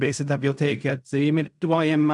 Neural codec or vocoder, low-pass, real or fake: codec, 16 kHz in and 24 kHz out, 0.4 kbps, LongCat-Audio-Codec, fine tuned four codebook decoder; 10.8 kHz; fake